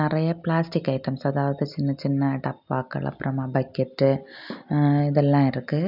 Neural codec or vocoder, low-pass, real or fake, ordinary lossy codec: none; 5.4 kHz; real; none